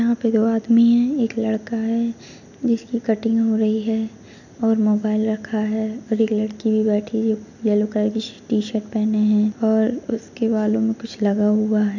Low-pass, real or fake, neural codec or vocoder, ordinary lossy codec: 7.2 kHz; real; none; none